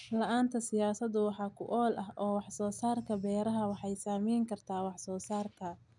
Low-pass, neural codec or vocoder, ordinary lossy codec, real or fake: 10.8 kHz; none; none; real